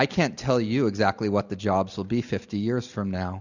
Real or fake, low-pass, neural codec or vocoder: real; 7.2 kHz; none